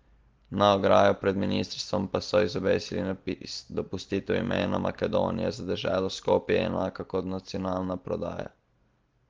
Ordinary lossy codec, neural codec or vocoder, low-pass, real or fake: Opus, 16 kbps; none; 7.2 kHz; real